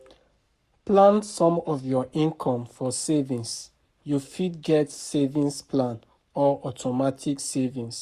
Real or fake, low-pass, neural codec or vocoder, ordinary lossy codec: fake; 14.4 kHz; codec, 44.1 kHz, 7.8 kbps, Pupu-Codec; Opus, 64 kbps